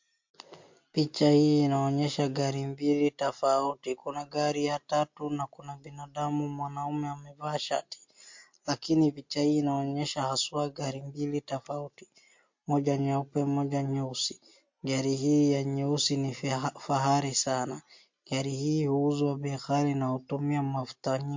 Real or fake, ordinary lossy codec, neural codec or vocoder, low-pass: real; MP3, 48 kbps; none; 7.2 kHz